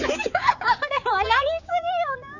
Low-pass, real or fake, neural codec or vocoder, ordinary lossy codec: 7.2 kHz; fake; codec, 16 kHz, 4 kbps, X-Codec, HuBERT features, trained on general audio; none